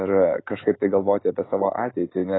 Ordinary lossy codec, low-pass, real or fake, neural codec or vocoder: AAC, 16 kbps; 7.2 kHz; real; none